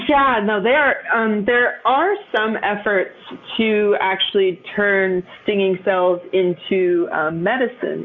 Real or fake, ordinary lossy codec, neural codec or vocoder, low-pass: real; MP3, 64 kbps; none; 7.2 kHz